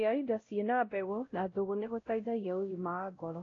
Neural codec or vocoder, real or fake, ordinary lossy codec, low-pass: codec, 16 kHz, 0.5 kbps, X-Codec, WavLM features, trained on Multilingual LibriSpeech; fake; AAC, 32 kbps; 7.2 kHz